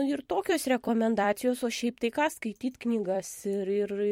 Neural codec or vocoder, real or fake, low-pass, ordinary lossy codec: vocoder, 44.1 kHz, 128 mel bands every 256 samples, BigVGAN v2; fake; 19.8 kHz; MP3, 64 kbps